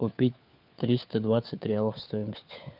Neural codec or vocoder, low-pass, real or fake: codec, 16 kHz, 4 kbps, FunCodec, trained on LibriTTS, 50 frames a second; 5.4 kHz; fake